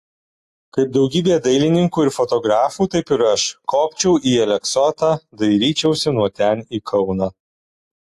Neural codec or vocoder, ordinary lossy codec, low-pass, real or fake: none; AAC, 64 kbps; 14.4 kHz; real